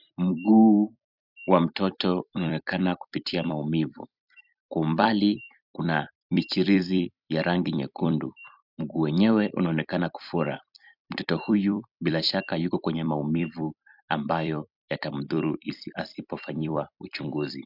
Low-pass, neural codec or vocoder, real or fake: 5.4 kHz; vocoder, 44.1 kHz, 128 mel bands every 256 samples, BigVGAN v2; fake